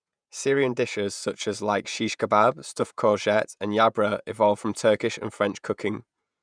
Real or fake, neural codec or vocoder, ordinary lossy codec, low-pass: fake; vocoder, 48 kHz, 128 mel bands, Vocos; none; 9.9 kHz